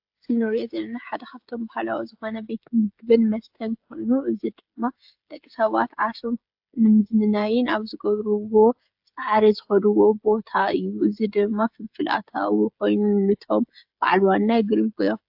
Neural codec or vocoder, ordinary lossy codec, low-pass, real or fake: codec, 16 kHz, 16 kbps, FreqCodec, smaller model; AAC, 48 kbps; 5.4 kHz; fake